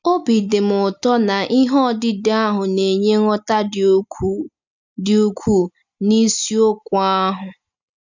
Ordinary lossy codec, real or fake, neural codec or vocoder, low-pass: none; real; none; 7.2 kHz